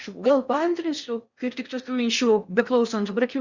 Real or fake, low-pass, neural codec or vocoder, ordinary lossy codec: fake; 7.2 kHz; codec, 16 kHz in and 24 kHz out, 0.6 kbps, FocalCodec, streaming, 4096 codes; Opus, 64 kbps